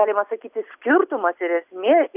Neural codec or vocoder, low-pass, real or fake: none; 3.6 kHz; real